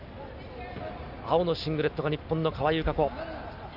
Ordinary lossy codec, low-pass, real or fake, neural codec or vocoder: none; 5.4 kHz; real; none